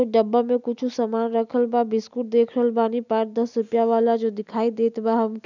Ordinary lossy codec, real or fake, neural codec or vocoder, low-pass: none; real; none; 7.2 kHz